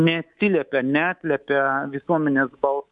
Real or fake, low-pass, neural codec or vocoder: fake; 10.8 kHz; codec, 24 kHz, 3.1 kbps, DualCodec